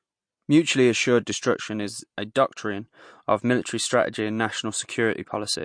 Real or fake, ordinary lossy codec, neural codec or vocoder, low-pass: real; MP3, 48 kbps; none; 9.9 kHz